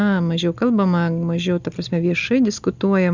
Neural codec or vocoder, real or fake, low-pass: none; real; 7.2 kHz